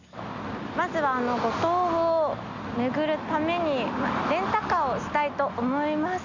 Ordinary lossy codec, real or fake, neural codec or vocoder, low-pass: none; real; none; 7.2 kHz